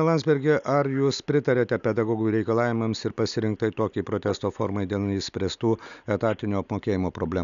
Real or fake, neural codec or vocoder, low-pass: real; none; 7.2 kHz